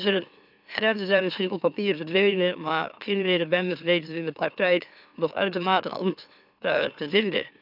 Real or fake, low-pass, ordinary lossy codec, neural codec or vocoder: fake; 5.4 kHz; none; autoencoder, 44.1 kHz, a latent of 192 numbers a frame, MeloTTS